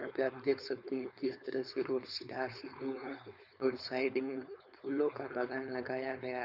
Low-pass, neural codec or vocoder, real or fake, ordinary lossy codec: 5.4 kHz; codec, 16 kHz, 4.8 kbps, FACodec; fake; none